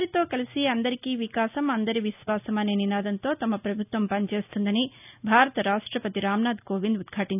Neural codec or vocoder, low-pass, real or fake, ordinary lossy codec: none; 3.6 kHz; real; none